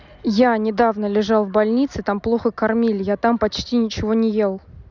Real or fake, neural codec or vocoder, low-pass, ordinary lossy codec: real; none; 7.2 kHz; none